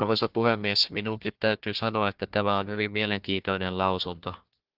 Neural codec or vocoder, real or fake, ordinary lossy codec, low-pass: codec, 16 kHz, 1 kbps, FunCodec, trained on Chinese and English, 50 frames a second; fake; Opus, 24 kbps; 5.4 kHz